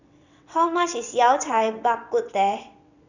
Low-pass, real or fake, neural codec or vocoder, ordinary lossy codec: 7.2 kHz; fake; vocoder, 22.05 kHz, 80 mel bands, WaveNeXt; none